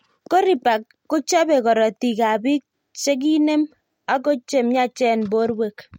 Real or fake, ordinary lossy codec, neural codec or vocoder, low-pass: real; MP3, 64 kbps; none; 19.8 kHz